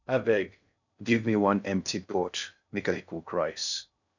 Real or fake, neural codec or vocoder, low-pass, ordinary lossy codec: fake; codec, 16 kHz in and 24 kHz out, 0.6 kbps, FocalCodec, streaming, 2048 codes; 7.2 kHz; none